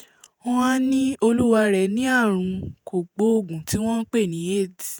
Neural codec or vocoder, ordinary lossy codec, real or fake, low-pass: vocoder, 48 kHz, 128 mel bands, Vocos; none; fake; none